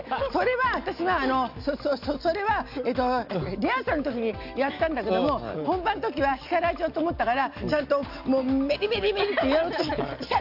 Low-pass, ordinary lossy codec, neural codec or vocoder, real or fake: 5.4 kHz; AAC, 48 kbps; none; real